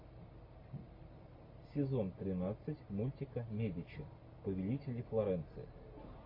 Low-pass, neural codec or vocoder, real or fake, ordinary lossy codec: 5.4 kHz; none; real; MP3, 32 kbps